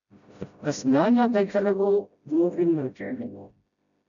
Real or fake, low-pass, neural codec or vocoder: fake; 7.2 kHz; codec, 16 kHz, 0.5 kbps, FreqCodec, smaller model